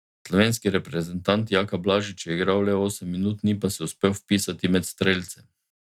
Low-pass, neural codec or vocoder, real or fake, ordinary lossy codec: 19.8 kHz; none; real; none